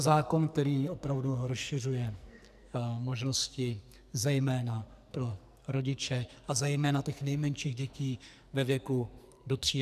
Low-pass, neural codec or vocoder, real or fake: 14.4 kHz; codec, 44.1 kHz, 2.6 kbps, SNAC; fake